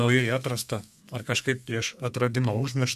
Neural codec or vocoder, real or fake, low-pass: codec, 32 kHz, 1.9 kbps, SNAC; fake; 14.4 kHz